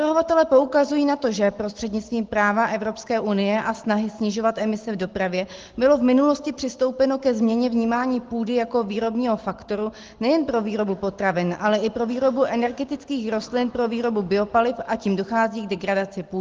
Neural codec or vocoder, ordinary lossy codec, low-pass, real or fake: none; Opus, 16 kbps; 7.2 kHz; real